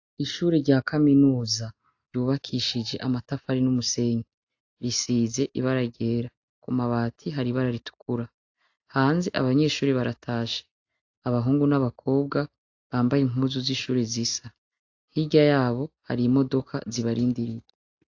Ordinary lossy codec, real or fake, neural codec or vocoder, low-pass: AAC, 48 kbps; real; none; 7.2 kHz